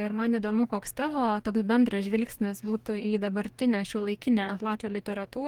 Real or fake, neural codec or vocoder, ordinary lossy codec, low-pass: fake; codec, 44.1 kHz, 2.6 kbps, DAC; Opus, 24 kbps; 19.8 kHz